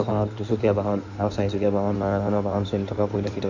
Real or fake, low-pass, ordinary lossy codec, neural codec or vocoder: fake; 7.2 kHz; Opus, 64 kbps; codec, 16 kHz in and 24 kHz out, 2.2 kbps, FireRedTTS-2 codec